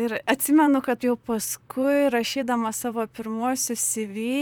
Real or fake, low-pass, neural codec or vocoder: fake; 19.8 kHz; vocoder, 44.1 kHz, 128 mel bands, Pupu-Vocoder